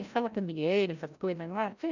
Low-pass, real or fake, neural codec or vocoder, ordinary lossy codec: 7.2 kHz; fake; codec, 16 kHz, 0.5 kbps, FreqCodec, larger model; none